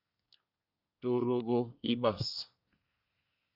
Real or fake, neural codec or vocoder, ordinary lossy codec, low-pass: fake; codec, 24 kHz, 1 kbps, SNAC; Opus, 64 kbps; 5.4 kHz